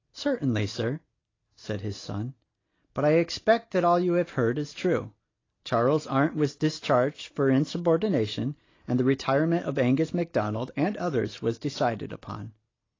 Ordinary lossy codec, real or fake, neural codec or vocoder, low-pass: AAC, 32 kbps; real; none; 7.2 kHz